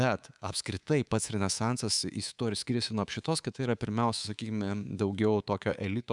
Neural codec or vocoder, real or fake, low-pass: codec, 24 kHz, 3.1 kbps, DualCodec; fake; 10.8 kHz